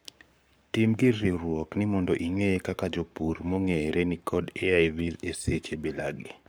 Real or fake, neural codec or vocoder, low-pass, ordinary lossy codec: fake; codec, 44.1 kHz, 7.8 kbps, Pupu-Codec; none; none